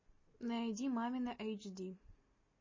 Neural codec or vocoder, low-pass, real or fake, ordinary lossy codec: none; 7.2 kHz; real; MP3, 32 kbps